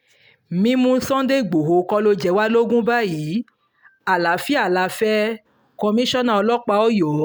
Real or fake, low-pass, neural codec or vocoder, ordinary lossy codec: real; none; none; none